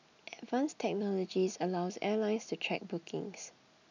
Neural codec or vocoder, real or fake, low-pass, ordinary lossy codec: none; real; 7.2 kHz; none